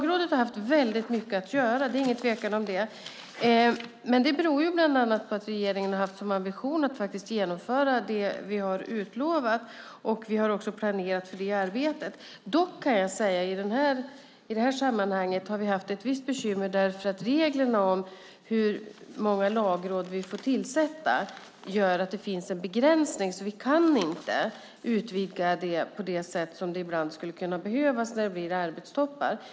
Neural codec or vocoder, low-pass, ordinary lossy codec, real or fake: none; none; none; real